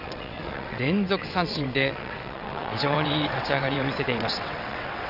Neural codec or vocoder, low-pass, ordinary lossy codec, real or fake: vocoder, 22.05 kHz, 80 mel bands, WaveNeXt; 5.4 kHz; none; fake